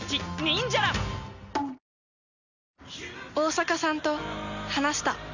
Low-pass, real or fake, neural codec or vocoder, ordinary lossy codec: 7.2 kHz; real; none; none